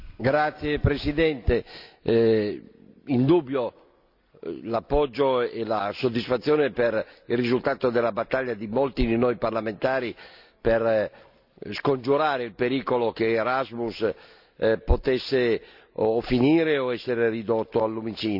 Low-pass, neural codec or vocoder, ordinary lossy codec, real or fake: 5.4 kHz; none; none; real